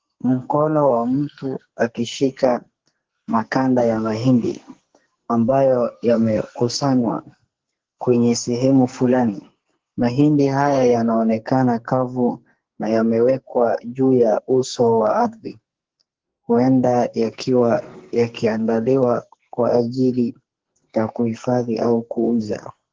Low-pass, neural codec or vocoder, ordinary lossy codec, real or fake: 7.2 kHz; codec, 44.1 kHz, 2.6 kbps, SNAC; Opus, 16 kbps; fake